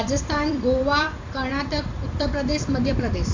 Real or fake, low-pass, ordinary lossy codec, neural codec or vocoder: fake; 7.2 kHz; AAC, 48 kbps; vocoder, 44.1 kHz, 128 mel bands every 256 samples, BigVGAN v2